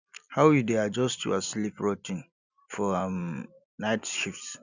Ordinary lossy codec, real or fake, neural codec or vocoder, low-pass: none; real; none; 7.2 kHz